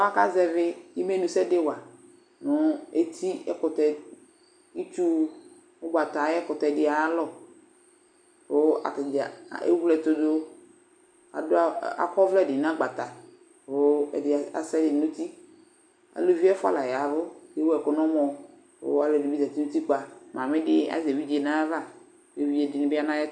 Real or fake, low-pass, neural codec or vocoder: real; 9.9 kHz; none